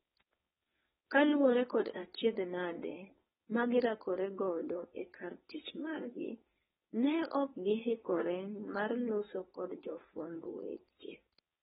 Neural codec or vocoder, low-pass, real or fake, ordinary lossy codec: codec, 16 kHz, 4.8 kbps, FACodec; 7.2 kHz; fake; AAC, 16 kbps